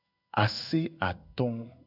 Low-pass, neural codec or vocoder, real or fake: 5.4 kHz; codec, 16 kHz in and 24 kHz out, 1 kbps, XY-Tokenizer; fake